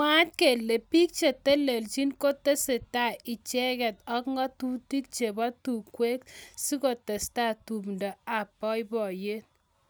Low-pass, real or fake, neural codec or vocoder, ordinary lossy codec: none; real; none; none